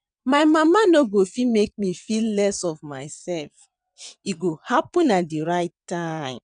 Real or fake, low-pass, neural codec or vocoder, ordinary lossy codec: fake; 9.9 kHz; vocoder, 22.05 kHz, 80 mel bands, WaveNeXt; none